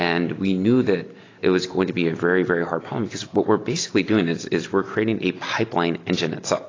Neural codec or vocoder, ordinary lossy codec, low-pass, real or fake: none; AAC, 32 kbps; 7.2 kHz; real